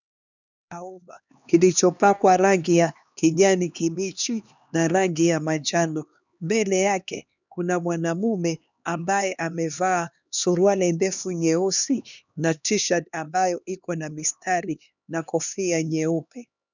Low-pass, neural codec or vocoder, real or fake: 7.2 kHz; codec, 16 kHz, 2 kbps, X-Codec, HuBERT features, trained on LibriSpeech; fake